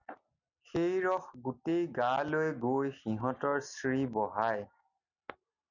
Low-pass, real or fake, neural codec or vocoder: 7.2 kHz; real; none